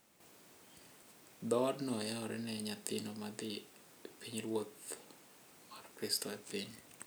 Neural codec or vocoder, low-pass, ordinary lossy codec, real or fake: none; none; none; real